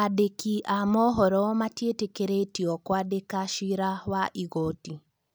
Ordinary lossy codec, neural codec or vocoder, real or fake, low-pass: none; none; real; none